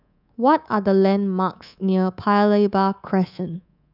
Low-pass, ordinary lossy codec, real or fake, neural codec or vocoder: 5.4 kHz; none; fake; codec, 24 kHz, 3.1 kbps, DualCodec